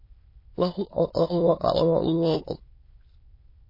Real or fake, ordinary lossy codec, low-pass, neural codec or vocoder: fake; MP3, 24 kbps; 5.4 kHz; autoencoder, 22.05 kHz, a latent of 192 numbers a frame, VITS, trained on many speakers